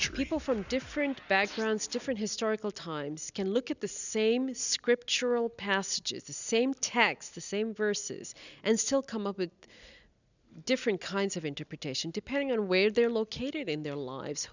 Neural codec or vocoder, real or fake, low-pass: none; real; 7.2 kHz